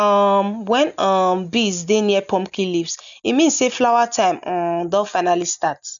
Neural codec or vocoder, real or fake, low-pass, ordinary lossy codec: none; real; 7.2 kHz; none